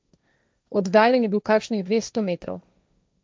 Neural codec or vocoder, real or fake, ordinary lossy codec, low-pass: codec, 16 kHz, 1.1 kbps, Voila-Tokenizer; fake; none; none